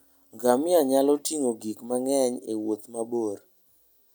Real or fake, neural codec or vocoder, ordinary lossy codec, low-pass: real; none; none; none